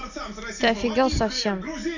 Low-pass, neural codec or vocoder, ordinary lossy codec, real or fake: 7.2 kHz; none; none; real